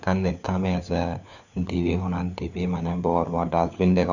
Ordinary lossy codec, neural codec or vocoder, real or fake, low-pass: none; codec, 16 kHz, 4 kbps, FunCodec, trained on LibriTTS, 50 frames a second; fake; 7.2 kHz